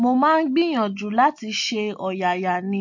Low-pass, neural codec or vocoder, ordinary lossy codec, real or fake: 7.2 kHz; none; MP3, 48 kbps; real